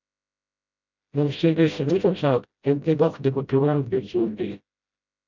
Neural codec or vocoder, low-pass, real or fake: codec, 16 kHz, 0.5 kbps, FreqCodec, smaller model; 7.2 kHz; fake